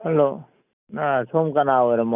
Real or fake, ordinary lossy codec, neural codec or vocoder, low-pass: real; none; none; 3.6 kHz